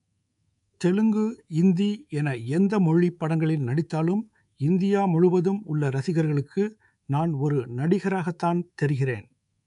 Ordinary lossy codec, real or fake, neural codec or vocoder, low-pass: none; fake; codec, 24 kHz, 3.1 kbps, DualCodec; 10.8 kHz